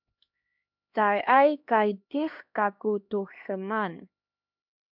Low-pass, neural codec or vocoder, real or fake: 5.4 kHz; codec, 16 kHz, 1 kbps, X-Codec, HuBERT features, trained on LibriSpeech; fake